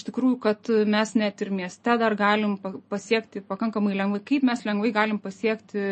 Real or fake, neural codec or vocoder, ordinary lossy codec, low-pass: real; none; MP3, 32 kbps; 10.8 kHz